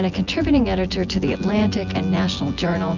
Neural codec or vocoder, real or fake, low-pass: vocoder, 24 kHz, 100 mel bands, Vocos; fake; 7.2 kHz